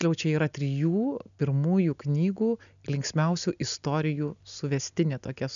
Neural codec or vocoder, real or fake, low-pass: none; real; 7.2 kHz